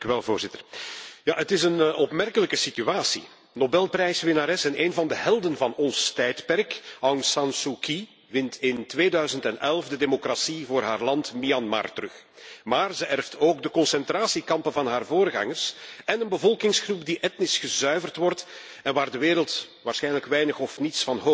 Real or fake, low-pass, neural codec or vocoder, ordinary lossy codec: real; none; none; none